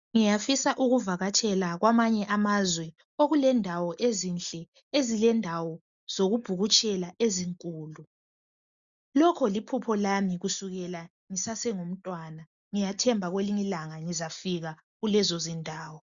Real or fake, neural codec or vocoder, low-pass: real; none; 7.2 kHz